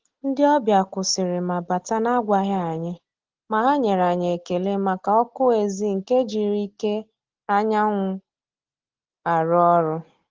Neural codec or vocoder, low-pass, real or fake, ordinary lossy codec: none; 7.2 kHz; real; Opus, 16 kbps